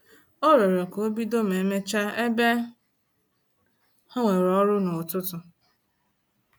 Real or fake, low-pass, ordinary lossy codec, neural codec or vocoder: real; none; none; none